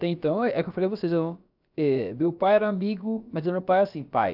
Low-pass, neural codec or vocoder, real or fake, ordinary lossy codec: 5.4 kHz; codec, 16 kHz, about 1 kbps, DyCAST, with the encoder's durations; fake; none